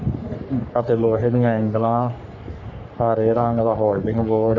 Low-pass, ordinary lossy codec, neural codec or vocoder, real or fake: 7.2 kHz; none; codec, 44.1 kHz, 3.4 kbps, Pupu-Codec; fake